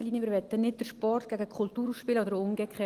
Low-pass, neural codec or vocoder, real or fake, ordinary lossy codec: 14.4 kHz; none; real; Opus, 24 kbps